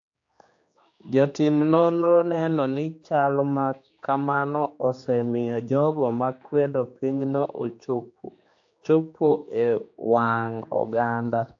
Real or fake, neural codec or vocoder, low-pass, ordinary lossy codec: fake; codec, 16 kHz, 2 kbps, X-Codec, HuBERT features, trained on general audio; 7.2 kHz; AAC, 48 kbps